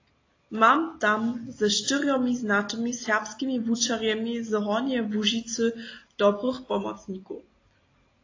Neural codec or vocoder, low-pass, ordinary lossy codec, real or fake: none; 7.2 kHz; AAC, 32 kbps; real